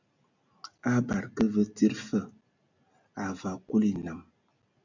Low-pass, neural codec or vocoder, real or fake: 7.2 kHz; none; real